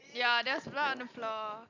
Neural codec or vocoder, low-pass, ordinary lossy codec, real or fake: none; 7.2 kHz; none; real